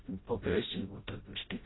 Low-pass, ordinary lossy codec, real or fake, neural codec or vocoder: 7.2 kHz; AAC, 16 kbps; fake; codec, 16 kHz, 0.5 kbps, FreqCodec, smaller model